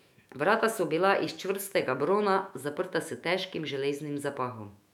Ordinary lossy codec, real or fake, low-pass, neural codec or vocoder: none; fake; 19.8 kHz; autoencoder, 48 kHz, 128 numbers a frame, DAC-VAE, trained on Japanese speech